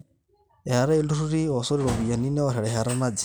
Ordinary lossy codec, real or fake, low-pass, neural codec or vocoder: none; real; none; none